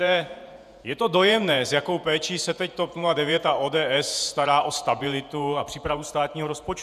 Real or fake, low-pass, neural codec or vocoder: fake; 14.4 kHz; vocoder, 48 kHz, 128 mel bands, Vocos